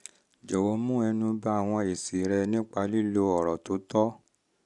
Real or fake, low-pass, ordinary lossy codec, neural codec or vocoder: real; 10.8 kHz; none; none